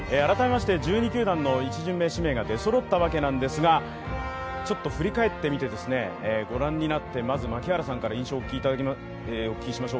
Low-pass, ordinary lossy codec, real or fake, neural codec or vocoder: none; none; real; none